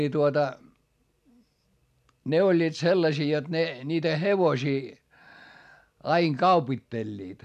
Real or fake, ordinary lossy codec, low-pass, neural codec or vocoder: real; AAC, 96 kbps; 14.4 kHz; none